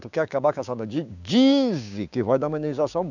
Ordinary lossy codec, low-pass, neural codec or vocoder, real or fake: none; 7.2 kHz; codec, 16 kHz, 6 kbps, DAC; fake